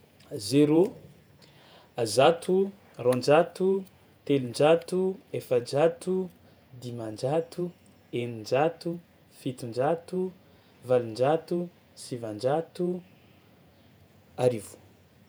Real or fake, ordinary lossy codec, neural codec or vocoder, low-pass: fake; none; vocoder, 48 kHz, 128 mel bands, Vocos; none